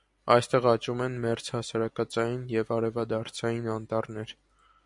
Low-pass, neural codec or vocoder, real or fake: 10.8 kHz; none; real